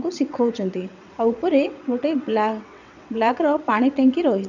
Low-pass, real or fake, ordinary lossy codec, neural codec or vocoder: 7.2 kHz; fake; none; vocoder, 22.05 kHz, 80 mel bands, WaveNeXt